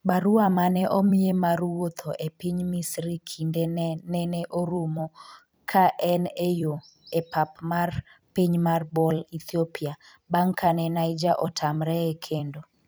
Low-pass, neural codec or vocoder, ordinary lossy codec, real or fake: none; vocoder, 44.1 kHz, 128 mel bands every 256 samples, BigVGAN v2; none; fake